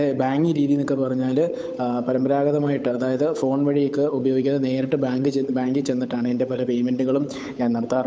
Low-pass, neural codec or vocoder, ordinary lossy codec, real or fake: none; codec, 16 kHz, 8 kbps, FunCodec, trained on Chinese and English, 25 frames a second; none; fake